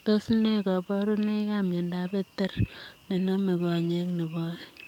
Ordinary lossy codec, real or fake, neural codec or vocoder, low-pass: MP3, 96 kbps; fake; codec, 44.1 kHz, 7.8 kbps, DAC; 19.8 kHz